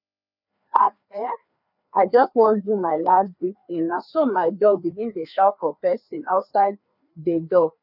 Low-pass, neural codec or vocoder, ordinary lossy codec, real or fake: 5.4 kHz; codec, 16 kHz, 2 kbps, FreqCodec, larger model; none; fake